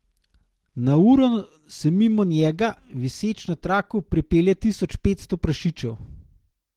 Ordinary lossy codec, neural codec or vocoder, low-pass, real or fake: Opus, 16 kbps; none; 19.8 kHz; real